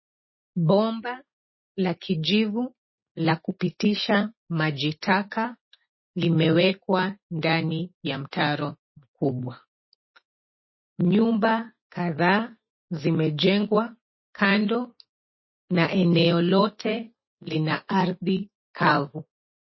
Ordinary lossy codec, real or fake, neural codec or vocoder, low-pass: MP3, 24 kbps; real; none; 7.2 kHz